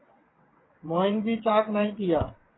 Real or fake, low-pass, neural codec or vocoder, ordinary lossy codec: fake; 7.2 kHz; vocoder, 22.05 kHz, 80 mel bands, WaveNeXt; AAC, 16 kbps